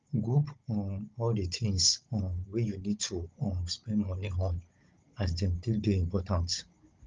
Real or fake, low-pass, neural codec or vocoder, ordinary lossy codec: fake; 7.2 kHz; codec, 16 kHz, 16 kbps, FunCodec, trained on Chinese and English, 50 frames a second; Opus, 24 kbps